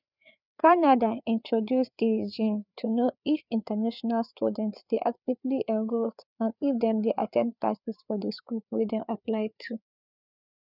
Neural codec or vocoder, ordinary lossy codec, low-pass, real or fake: codec, 16 kHz, 4 kbps, FreqCodec, larger model; none; 5.4 kHz; fake